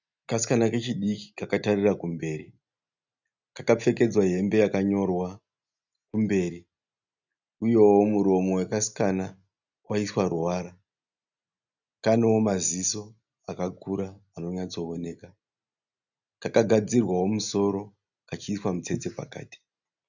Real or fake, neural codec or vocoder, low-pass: real; none; 7.2 kHz